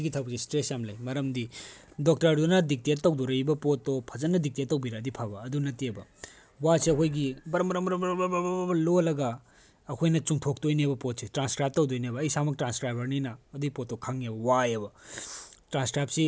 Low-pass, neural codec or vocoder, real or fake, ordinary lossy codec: none; none; real; none